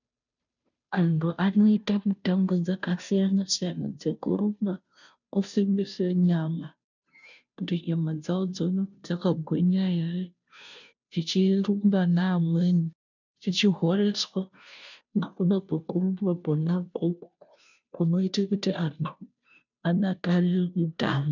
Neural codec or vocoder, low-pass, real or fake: codec, 16 kHz, 0.5 kbps, FunCodec, trained on Chinese and English, 25 frames a second; 7.2 kHz; fake